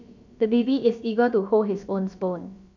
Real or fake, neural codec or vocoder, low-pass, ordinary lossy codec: fake; codec, 16 kHz, about 1 kbps, DyCAST, with the encoder's durations; 7.2 kHz; none